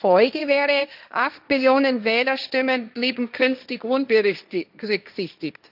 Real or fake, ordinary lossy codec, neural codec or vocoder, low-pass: fake; none; codec, 16 kHz, 1.1 kbps, Voila-Tokenizer; 5.4 kHz